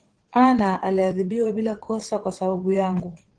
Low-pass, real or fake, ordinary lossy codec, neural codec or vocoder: 9.9 kHz; fake; Opus, 16 kbps; vocoder, 22.05 kHz, 80 mel bands, WaveNeXt